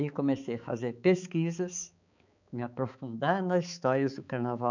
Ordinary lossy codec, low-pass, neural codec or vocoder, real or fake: none; 7.2 kHz; codec, 16 kHz, 4 kbps, X-Codec, HuBERT features, trained on balanced general audio; fake